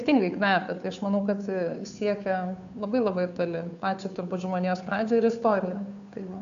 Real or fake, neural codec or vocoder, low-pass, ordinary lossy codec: fake; codec, 16 kHz, 2 kbps, FunCodec, trained on Chinese and English, 25 frames a second; 7.2 kHz; AAC, 64 kbps